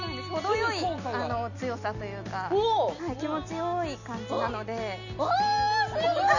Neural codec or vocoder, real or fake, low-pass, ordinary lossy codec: none; real; 7.2 kHz; none